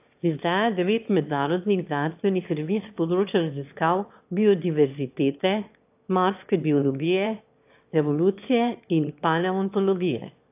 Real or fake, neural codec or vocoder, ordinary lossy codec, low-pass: fake; autoencoder, 22.05 kHz, a latent of 192 numbers a frame, VITS, trained on one speaker; AAC, 32 kbps; 3.6 kHz